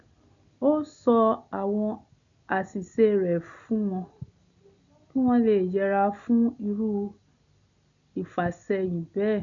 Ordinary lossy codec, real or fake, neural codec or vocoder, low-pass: none; real; none; 7.2 kHz